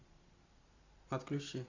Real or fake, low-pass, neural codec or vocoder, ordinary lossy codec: real; 7.2 kHz; none; AAC, 48 kbps